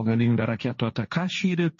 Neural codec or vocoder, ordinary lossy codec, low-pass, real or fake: codec, 16 kHz, 1.1 kbps, Voila-Tokenizer; MP3, 32 kbps; 7.2 kHz; fake